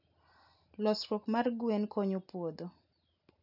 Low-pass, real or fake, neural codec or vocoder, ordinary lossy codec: 5.4 kHz; real; none; none